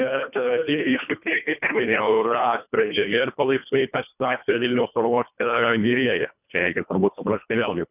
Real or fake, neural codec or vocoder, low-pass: fake; codec, 24 kHz, 1.5 kbps, HILCodec; 3.6 kHz